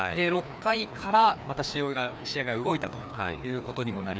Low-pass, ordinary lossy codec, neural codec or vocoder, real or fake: none; none; codec, 16 kHz, 2 kbps, FreqCodec, larger model; fake